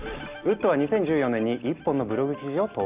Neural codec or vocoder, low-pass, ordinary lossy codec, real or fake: none; 3.6 kHz; Opus, 64 kbps; real